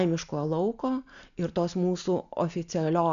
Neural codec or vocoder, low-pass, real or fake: none; 7.2 kHz; real